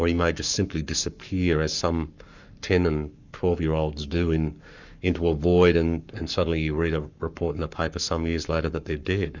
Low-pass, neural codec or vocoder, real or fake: 7.2 kHz; codec, 44.1 kHz, 7.8 kbps, Pupu-Codec; fake